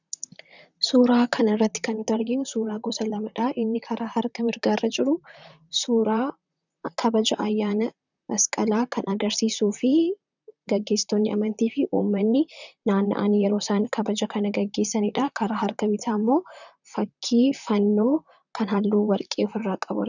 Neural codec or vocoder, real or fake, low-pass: vocoder, 44.1 kHz, 128 mel bands, Pupu-Vocoder; fake; 7.2 kHz